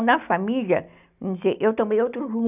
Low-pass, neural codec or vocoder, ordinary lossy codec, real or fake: 3.6 kHz; none; none; real